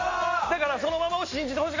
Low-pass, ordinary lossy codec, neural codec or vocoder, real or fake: 7.2 kHz; MP3, 32 kbps; none; real